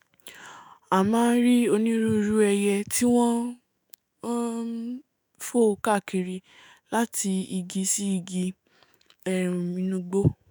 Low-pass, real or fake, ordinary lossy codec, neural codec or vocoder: none; fake; none; autoencoder, 48 kHz, 128 numbers a frame, DAC-VAE, trained on Japanese speech